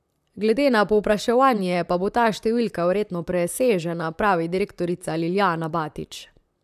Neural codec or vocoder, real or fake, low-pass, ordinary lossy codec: vocoder, 44.1 kHz, 128 mel bands, Pupu-Vocoder; fake; 14.4 kHz; none